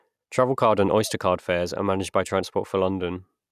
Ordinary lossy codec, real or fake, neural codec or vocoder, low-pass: none; real; none; 14.4 kHz